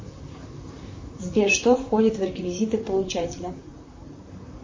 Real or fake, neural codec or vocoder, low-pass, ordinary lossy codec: fake; vocoder, 44.1 kHz, 128 mel bands, Pupu-Vocoder; 7.2 kHz; MP3, 32 kbps